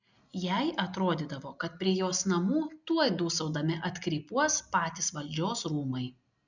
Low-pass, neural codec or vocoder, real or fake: 7.2 kHz; none; real